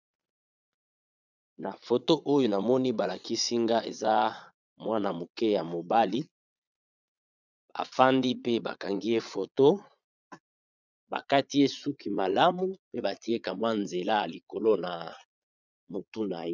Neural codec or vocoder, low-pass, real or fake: vocoder, 22.05 kHz, 80 mel bands, Vocos; 7.2 kHz; fake